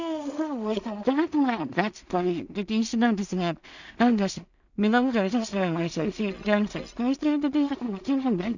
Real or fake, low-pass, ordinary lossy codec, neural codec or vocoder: fake; 7.2 kHz; none; codec, 16 kHz in and 24 kHz out, 0.4 kbps, LongCat-Audio-Codec, two codebook decoder